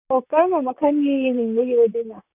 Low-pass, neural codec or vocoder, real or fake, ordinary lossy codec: 3.6 kHz; vocoder, 44.1 kHz, 128 mel bands, Pupu-Vocoder; fake; none